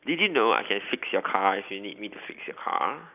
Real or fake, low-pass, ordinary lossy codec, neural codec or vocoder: real; 3.6 kHz; none; none